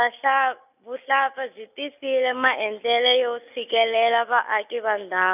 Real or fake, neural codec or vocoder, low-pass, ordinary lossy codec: real; none; 3.6 kHz; none